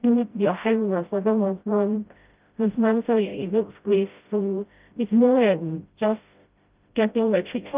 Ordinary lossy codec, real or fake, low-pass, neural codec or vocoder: Opus, 24 kbps; fake; 3.6 kHz; codec, 16 kHz, 0.5 kbps, FreqCodec, smaller model